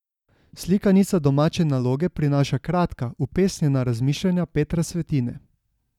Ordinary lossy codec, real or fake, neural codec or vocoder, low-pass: none; real; none; 19.8 kHz